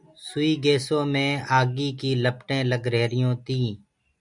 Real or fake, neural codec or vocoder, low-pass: real; none; 10.8 kHz